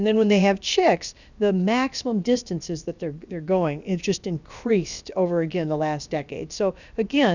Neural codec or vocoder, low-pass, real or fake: codec, 16 kHz, about 1 kbps, DyCAST, with the encoder's durations; 7.2 kHz; fake